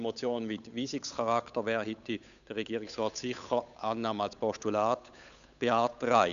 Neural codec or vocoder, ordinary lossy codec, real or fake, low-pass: codec, 16 kHz, 8 kbps, FunCodec, trained on Chinese and English, 25 frames a second; none; fake; 7.2 kHz